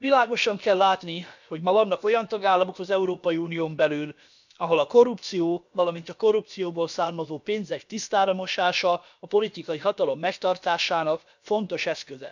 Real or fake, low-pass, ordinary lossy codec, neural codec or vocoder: fake; 7.2 kHz; none; codec, 16 kHz, about 1 kbps, DyCAST, with the encoder's durations